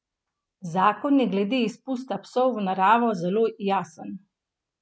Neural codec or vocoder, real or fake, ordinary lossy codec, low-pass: none; real; none; none